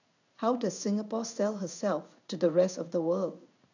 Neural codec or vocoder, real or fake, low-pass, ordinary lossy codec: codec, 16 kHz in and 24 kHz out, 1 kbps, XY-Tokenizer; fake; 7.2 kHz; none